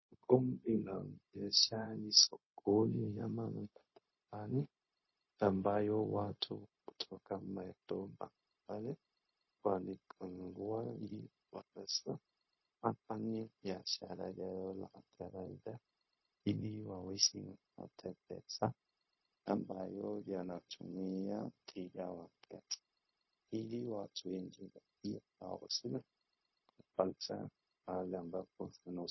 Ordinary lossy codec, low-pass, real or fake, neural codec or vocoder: MP3, 24 kbps; 7.2 kHz; fake; codec, 16 kHz, 0.4 kbps, LongCat-Audio-Codec